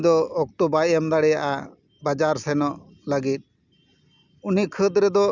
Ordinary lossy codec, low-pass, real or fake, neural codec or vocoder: none; 7.2 kHz; real; none